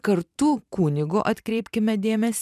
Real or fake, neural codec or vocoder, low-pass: fake; vocoder, 44.1 kHz, 128 mel bands every 512 samples, BigVGAN v2; 14.4 kHz